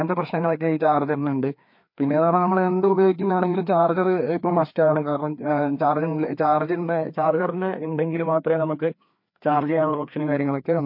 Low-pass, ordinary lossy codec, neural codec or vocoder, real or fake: 5.4 kHz; MP3, 32 kbps; codec, 16 kHz, 2 kbps, FreqCodec, larger model; fake